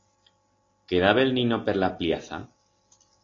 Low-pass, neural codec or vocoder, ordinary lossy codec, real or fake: 7.2 kHz; none; AAC, 32 kbps; real